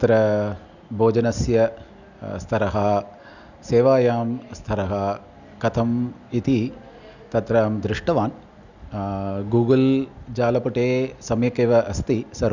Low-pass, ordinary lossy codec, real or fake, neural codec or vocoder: 7.2 kHz; none; real; none